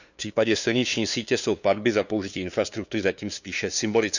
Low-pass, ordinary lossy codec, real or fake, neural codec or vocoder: 7.2 kHz; none; fake; codec, 16 kHz, 2 kbps, FunCodec, trained on LibriTTS, 25 frames a second